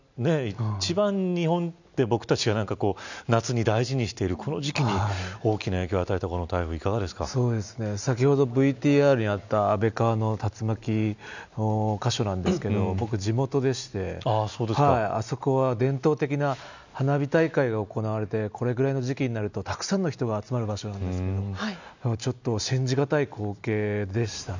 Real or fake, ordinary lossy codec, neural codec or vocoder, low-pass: real; none; none; 7.2 kHz